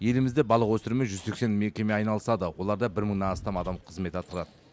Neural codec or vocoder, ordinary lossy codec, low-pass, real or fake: none; none; none; real